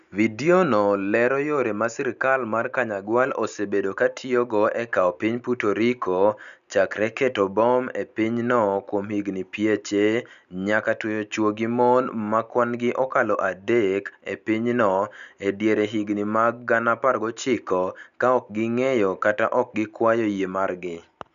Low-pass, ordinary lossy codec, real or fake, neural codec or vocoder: 7.2 kHz; none; real; none